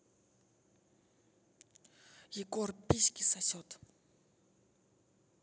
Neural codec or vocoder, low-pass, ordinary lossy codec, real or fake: none; none; none; real